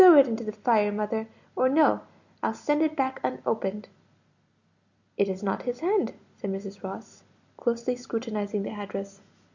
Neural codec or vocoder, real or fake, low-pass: none; real; 7.2 kHz